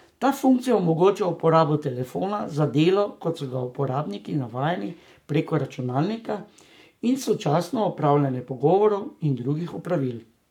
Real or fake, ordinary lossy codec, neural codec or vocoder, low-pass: fake; none; codec, 44.1 kHz, 7.8 kbps, Pupu-Codec; 19.8 kHz